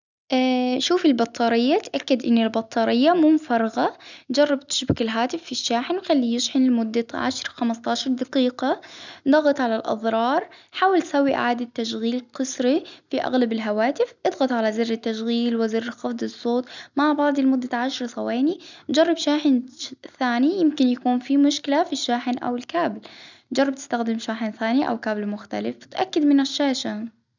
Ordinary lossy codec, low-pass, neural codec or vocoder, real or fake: none; 7.2 kHz; none; real